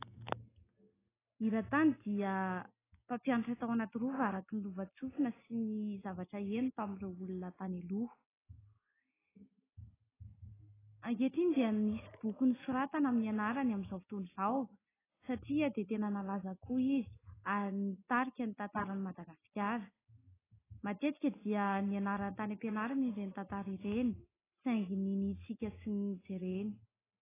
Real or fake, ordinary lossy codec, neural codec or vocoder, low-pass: real; AAC, 16 kbps; none; 3.6 kHz